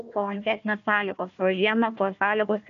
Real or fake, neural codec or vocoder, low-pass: fake; codec, 16 kHz, 1 kbps, FunCodec, trained on Chinese and English, 50 frames a second; 7.2 kHz